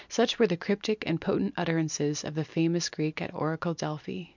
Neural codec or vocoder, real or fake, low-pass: none; real; 7.2 kHz